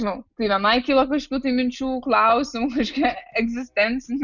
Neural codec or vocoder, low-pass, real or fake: none; 7.2 kHz; real